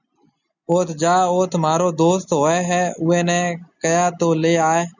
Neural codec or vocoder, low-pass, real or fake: none; 7.2 kHz; real